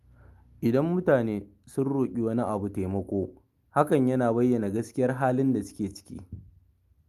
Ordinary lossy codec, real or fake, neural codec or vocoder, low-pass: Opus, 32 kbps; real; none; 14.4 kHz